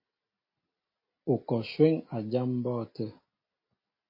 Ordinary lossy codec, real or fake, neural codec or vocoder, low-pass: MP3, 24 kbps; real; none; 5.4 kHz